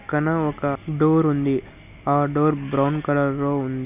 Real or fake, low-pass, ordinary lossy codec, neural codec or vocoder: real; 3.6 kHz; none; none